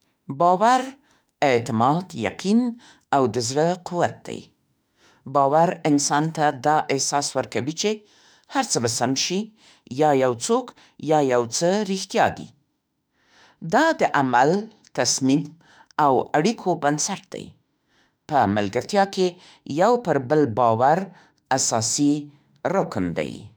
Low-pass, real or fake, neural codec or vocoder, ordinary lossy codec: none; fake; autoencoder, 48 kHz, 32 numbers a frame, DAC-VAE, trained on Japanese speech; none